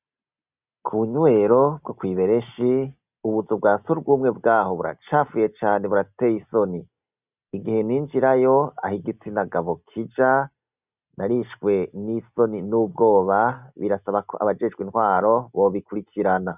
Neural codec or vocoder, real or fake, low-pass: none; real; 3.6 kHz